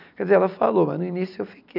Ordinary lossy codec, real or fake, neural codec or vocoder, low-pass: none; real; none; 5.4 kHz